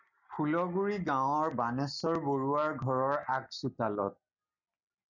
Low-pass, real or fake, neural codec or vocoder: 7.2 kHz; real; none